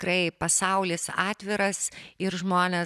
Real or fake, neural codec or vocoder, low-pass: real; none; 14.4 kHz